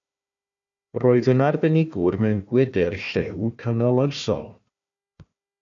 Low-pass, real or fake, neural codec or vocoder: 7.2 kHz; fake; codec, 16 kHz, 1 kbps, FunCodec, trained on Chinese and English, 50 frames a second